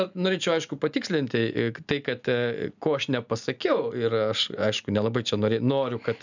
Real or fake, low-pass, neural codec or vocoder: real; 7.2 kHz; none